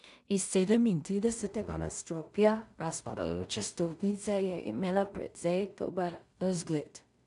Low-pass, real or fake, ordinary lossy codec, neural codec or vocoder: 10.8 kHz; fake; none; codec, 16 kHz in and 24 kHz out, 0.4 kbps, LongCat-Audio-Codec, two codebook decoder